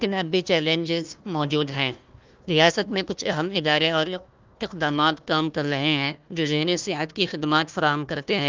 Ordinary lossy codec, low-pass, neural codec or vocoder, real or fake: Opus, 24 kbps; 7.2 kHz; codec, 16 kHz, 1 kbps, FunCodec, trained on Chinese and English, 50 frames a second; fake